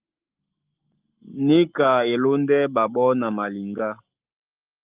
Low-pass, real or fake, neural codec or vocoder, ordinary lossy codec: 3.6 kHz; fake; codec, 44.1 kHz, 7.8 kbps, Pupu-Codec; Opus, 32 kbps